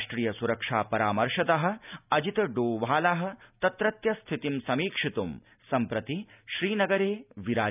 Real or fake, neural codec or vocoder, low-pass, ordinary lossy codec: real; none; 3.6 kHz; none